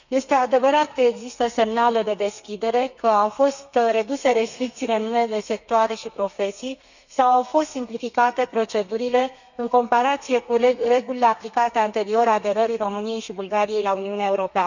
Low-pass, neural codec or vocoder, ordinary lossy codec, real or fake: 7.2 kHz; codec, 32 kHz, 1.9 kbps, SNAC; none; fake